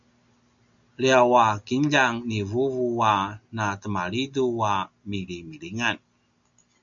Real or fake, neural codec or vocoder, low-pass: real; none; 7.2 kHz